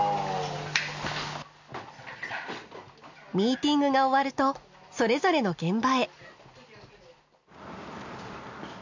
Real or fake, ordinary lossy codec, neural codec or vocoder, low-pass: real; none; none; 7.2 kHz